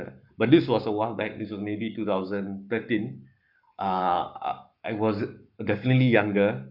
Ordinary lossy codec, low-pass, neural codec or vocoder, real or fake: none; 5.4 kHz; codec, 44.1 kHz, 7.8 kbps, Pupu-Codec; fake